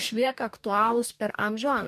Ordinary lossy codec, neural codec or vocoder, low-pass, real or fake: MP3, 96 kbps; codec, 44.1 kHz, 2.6 kbps, DAC; 14.4 kHz; fake